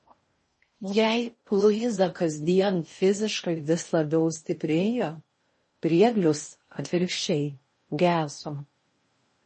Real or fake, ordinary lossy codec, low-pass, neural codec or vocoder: fake; MP3, 32 kbps; 10.8 kHz; codec, 16 kHz in and 24 kHz out, 0.6 kbps, FocalCodec, streaming, 4096 codes